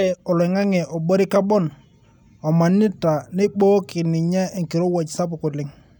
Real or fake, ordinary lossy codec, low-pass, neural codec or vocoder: real; none; 19.8 kHz; none